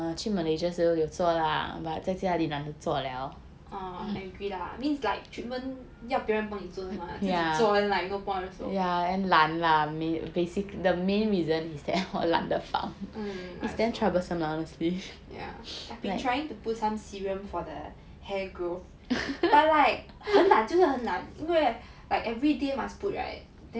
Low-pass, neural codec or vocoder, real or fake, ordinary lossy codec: none; none; real; none